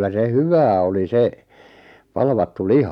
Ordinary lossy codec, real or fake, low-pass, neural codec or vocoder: none; real; 19.8 kHz; none